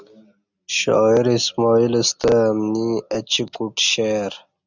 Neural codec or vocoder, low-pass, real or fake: none; 7.2 kHz; real